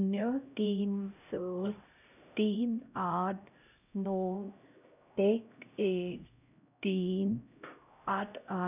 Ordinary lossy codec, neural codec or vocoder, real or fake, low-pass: none; codec, 16 kHz, 0.5 kbps, X-Codec, HuBERT features, trained on LibriSpeech; fake; 3.6 kHz